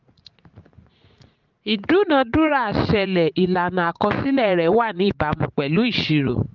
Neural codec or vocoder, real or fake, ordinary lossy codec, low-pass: vocoder, 44.1 kHz, 80 mel bands, Vocos; fake; Opus, 32 kbps; 7.2 kHz